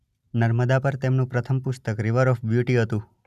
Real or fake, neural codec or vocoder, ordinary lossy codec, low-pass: real; none; none; 14.4 kHz